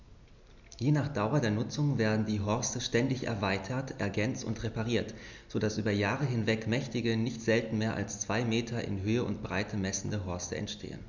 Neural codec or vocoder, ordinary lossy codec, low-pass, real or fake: none; none; 7.2 kHz; real